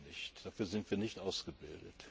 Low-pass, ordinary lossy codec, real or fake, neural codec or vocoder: none; none; real; none